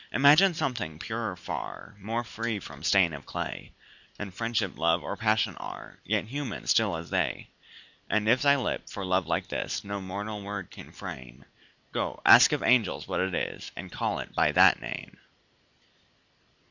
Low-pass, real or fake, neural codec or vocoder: 7.2 kHz; real; none